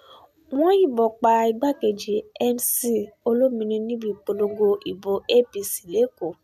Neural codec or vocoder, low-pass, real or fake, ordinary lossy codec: none; 14.4 kHz; real; none